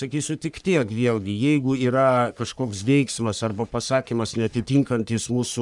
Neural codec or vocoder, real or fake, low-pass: codec, 44.1 kHz, 3.4 kbps, Pupu-Codec; fake; 10.8 kHz